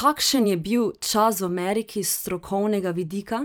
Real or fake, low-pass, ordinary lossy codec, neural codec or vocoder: fake; none; none; vocoder, 44.1 kHz, 128 mel bands every 512 samples, BigVGAN v2